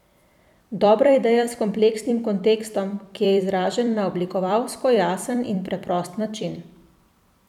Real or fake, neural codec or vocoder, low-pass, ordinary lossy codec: fake; vocoder, 44.1 kHz, 128 mel bands every 256 samples, BigVGAN v2; 19.8 kHz; none